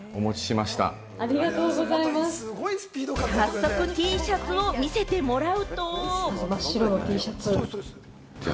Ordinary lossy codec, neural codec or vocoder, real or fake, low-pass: none; none; real; none